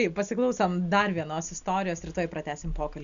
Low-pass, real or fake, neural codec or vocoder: 7.2 kHz; real; none